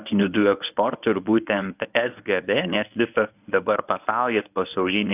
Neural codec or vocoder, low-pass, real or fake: codec, 24 kHz, 0.9 kbps, WavTokenizer, medium speech release version 1; 3.6 kHz; fake